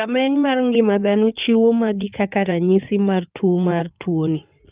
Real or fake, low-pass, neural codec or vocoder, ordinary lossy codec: fake; 3.6 kHz; codec, 16 kHz in and 24 kHz out, 2.2 kbps, FireRedTTS-2 codec; Opus, 24 kbps